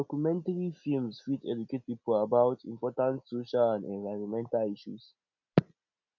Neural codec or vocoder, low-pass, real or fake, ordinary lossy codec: none; 7.2 kHz; real; none